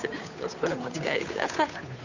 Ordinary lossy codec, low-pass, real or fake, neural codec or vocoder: none; 7.2 kHz; fake; codec, 16 kHz, 8 kbps, FunCodec, trained on Chinese and English, 25 frames a second